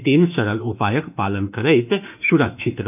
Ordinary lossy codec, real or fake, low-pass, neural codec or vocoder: none; fake; 3.6 kHz; autoencoder, 48 kHz, 32 numbers a frame, DAC-VAE, trained on Japanese speech